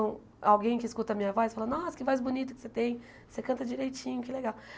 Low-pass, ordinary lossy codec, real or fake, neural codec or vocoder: none; none; real; none